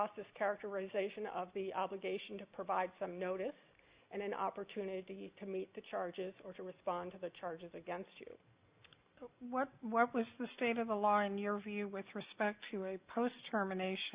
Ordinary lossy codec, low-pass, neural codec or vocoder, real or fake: Opus, 64 kbps; 3.6 kHz; none; real